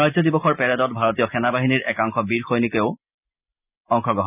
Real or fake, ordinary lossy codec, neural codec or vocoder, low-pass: real; none; none; 3.6 kHz